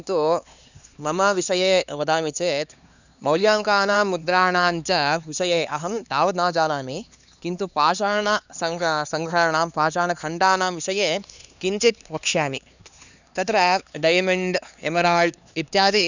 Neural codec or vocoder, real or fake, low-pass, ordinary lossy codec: codec, 16 kHz, 2 kbps, X-Codec, HuBERT features, trained on LibriSpeech; fake; 7.2 kHz; none